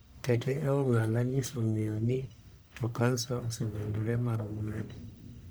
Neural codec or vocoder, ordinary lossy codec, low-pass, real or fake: codec, 44.1 kHz, 1.7 kbps, Pupu-Codec; none; none; fake